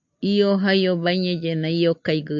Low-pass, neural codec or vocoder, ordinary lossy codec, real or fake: 7.2 kHz; none; MP3, 64 kbps; real